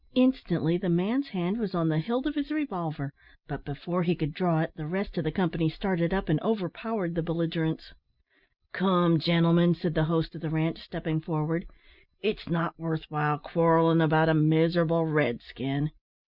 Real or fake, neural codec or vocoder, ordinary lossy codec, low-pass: real; none; Opus, 64 kbps; 5.4 kHz